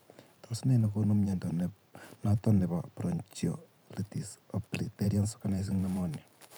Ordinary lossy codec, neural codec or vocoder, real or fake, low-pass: none; vocoder, 44.1 kHz, 128 mel bands every 512 samples, BigVGAN v2; fake; none